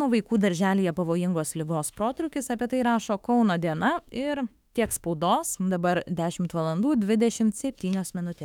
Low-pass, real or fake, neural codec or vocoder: 19.8 kHz; fake; autoencoder, 48 kHz, 32 numbers a frame, DAC-VAE, trained on Japanese speech